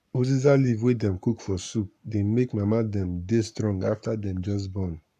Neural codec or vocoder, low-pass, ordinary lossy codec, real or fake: codec, 44.1 kHz, 7.8 kbps, Pupu-Codec; 14.4 kHz; AAC, 96 kbps; fake